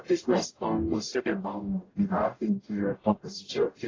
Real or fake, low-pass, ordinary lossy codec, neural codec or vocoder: fake; 7.2 kHz; AAC, 32 kbps; codec, 44.1 kHz, 0.9 kbps, DAC